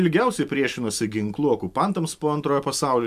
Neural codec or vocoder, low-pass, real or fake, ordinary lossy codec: none; 14.4 kHz; real; MP3, 96 kbps